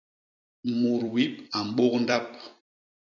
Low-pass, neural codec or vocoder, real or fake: 7.2 kHz; vocoder, 44.1 kHz, 128 mel bands every 256 samples, BigVGAN v2; fake